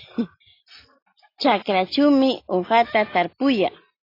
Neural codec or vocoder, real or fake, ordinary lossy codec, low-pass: none; real; AAC, 32 kbps; 5.4 kHz